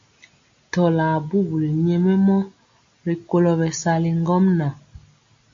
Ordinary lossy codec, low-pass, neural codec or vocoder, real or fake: AAC, 48 kbps; 7.2 kHz; none; real